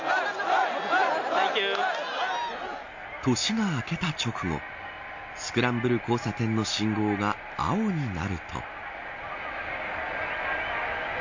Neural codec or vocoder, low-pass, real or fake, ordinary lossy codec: none; 7.2 kHz; real; MP3, 48 kbps